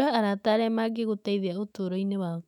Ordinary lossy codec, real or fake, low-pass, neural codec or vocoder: none; fake; 19.8 kHz; autoencoder, 48 kHz, 128 numbers a frame, DAC-VAE, trained on Japanese speech